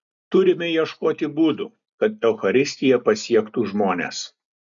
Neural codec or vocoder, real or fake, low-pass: none; real; 7.2 kHz